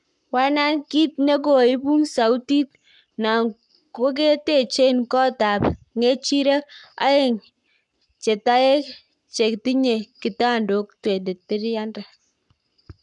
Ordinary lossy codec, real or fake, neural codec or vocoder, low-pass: none; fake; codec, 44.1 kHz, 7.8 kbps, Pupu-Codec; 10.8 kHz